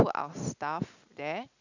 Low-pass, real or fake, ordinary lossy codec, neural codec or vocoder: 7.2 kHz; real; none; none